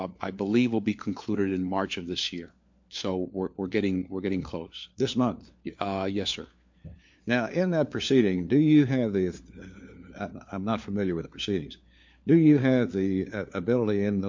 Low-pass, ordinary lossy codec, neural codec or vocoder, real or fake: 7.2 kHz; MP3, 48 kbps; codec, 16 kHz, 4 kbps, FunCodec, trained on LibriTTS, 50 frames a second; fake